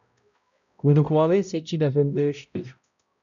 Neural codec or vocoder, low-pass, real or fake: codec, 16 kHz, 0.5 kbps, X-Codec, HuBERT features, trained on balanced general audio; 7.2 kHz; fake